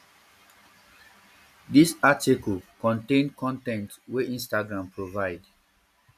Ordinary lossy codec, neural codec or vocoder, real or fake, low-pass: none; none; real; 14.4 kHz